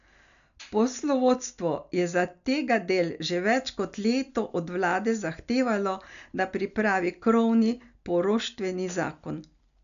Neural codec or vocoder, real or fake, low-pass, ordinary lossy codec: none; real; 7.2 kHz; none